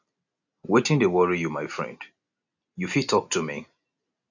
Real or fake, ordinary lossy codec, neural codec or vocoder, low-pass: real; none; none; 7.2 kHz